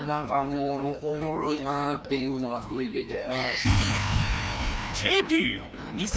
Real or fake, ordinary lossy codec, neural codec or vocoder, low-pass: fake; none; codec, 16 kHz, 1 kbps, FreqCodec, larger model; none